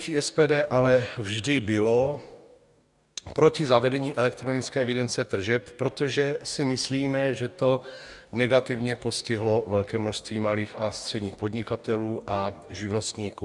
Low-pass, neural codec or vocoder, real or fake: 10.8 kHz; codec, 44.1 kHz, 2.6 kbps, DAC; fake